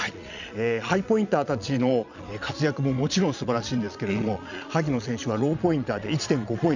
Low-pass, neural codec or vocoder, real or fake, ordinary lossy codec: 7.2 kHz; vocoder, 22.05 kHz, 80 mel bands, Vocos; fake; none